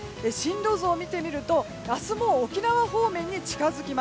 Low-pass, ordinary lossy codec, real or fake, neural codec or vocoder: none; none; real; none